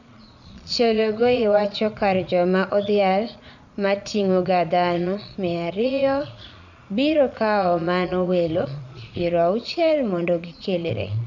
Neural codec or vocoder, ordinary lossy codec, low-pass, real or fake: vocoder, 22.05 kHz, 80 mel bands, Vocos; none; 7.2 kHz; fake